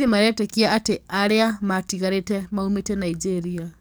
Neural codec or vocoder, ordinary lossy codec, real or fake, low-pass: codec, 44.1 kHz, 7.8 kbps, DAC; none; fake; none